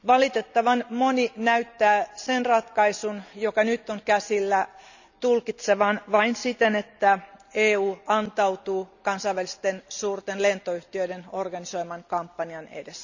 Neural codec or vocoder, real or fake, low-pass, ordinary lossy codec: none; real; 7.2 kHz; none